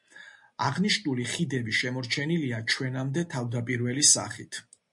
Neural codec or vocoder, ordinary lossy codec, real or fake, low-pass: none; MP3, 48 kbps; real; 10.8 kHz